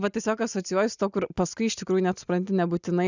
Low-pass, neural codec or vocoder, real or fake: 7.2 kHz; none; real